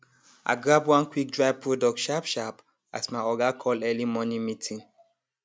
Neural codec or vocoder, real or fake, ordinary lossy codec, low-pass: none; real; none; none